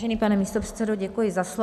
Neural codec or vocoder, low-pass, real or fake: none; 14.4 kHz; real